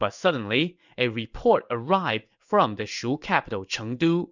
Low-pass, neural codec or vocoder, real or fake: 7.2 kHz; codec, 16 kHz in and 24 kHz out, 1 kbps, XY-Tokenizer; fake